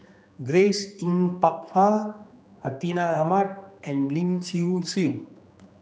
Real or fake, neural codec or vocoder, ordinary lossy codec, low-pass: fake; codec, 16 kHz, 2 kbps, X-Codec, HuBERT features, trained on general audio; none; none